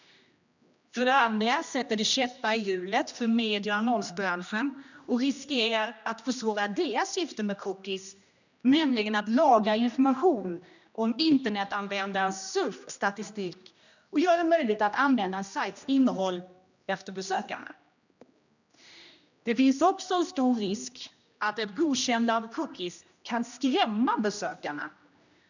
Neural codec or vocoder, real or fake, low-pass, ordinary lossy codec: codec, 16 kHz, 1 kbps, X-Codec, HuBERT features, trained on general audio; fake; 7.2 kHz; none